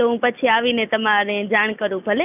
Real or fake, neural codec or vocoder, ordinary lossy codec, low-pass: real; none; none; 3.6 kHz